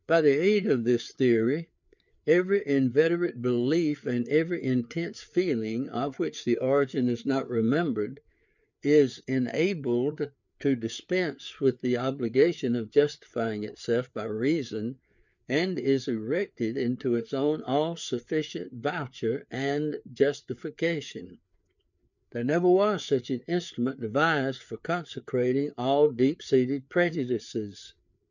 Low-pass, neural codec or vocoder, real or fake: 7.2 kHz; codec, 16 kHz, 4 kbps, FreqCodec, larger model; fake